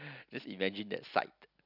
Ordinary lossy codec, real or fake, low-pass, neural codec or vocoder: none; real; 5.4 kHz; none